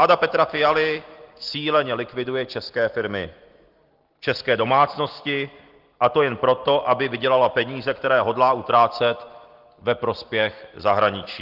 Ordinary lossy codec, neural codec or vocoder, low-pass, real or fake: Opus, 16 kbps; none; 5.4 kHz; real